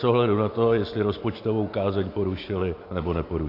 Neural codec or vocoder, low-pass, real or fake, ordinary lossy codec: none; 5.4 kHz; real; AAC, 32 kbps